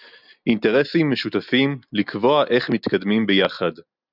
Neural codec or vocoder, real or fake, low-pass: none; real; 5.4 kHz